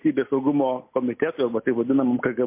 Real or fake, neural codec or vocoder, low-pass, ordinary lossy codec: real; none; 3.6 kHz; MP3, 24 kbps